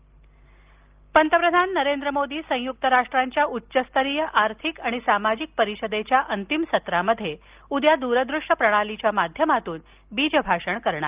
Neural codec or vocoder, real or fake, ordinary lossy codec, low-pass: none; real; Opus, 24 kbps; 3.6 kHz